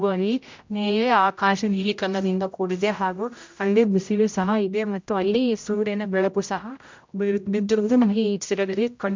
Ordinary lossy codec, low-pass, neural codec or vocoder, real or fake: MP3, 64 kbps; 7.2 kHz; codec, 16 kHz, 0.5 kbps, X-Codec, HuBERT features, trained on general audio; fake